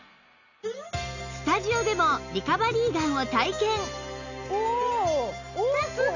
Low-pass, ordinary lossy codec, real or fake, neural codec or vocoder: 7.2 kHz; none; real; none